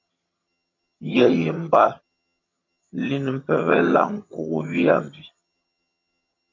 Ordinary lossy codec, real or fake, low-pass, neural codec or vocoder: MP3, 48 kbps; fake; 7.2 kHz; vocoder, 22.05 kHz, 80 mel bands, HiFi-GAN